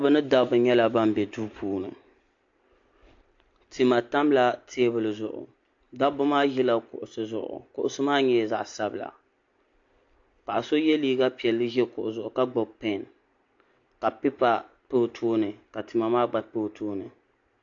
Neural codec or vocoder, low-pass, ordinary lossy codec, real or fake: none; 7.2 kHz; AAC, 48 kbps; real